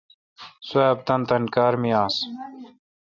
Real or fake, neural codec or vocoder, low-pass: real; none; 7.2 kHz